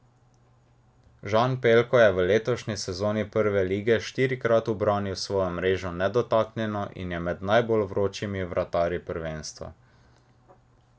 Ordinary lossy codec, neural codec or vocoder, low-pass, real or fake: none; none; none; real